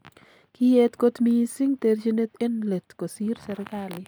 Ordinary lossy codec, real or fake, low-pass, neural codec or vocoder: none; real; none; none